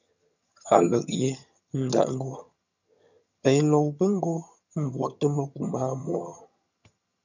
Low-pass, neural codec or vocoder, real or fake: 7.2 kHz; vocoder, 22.05 kHz, 80 mel bands, HiFi-GAN; fake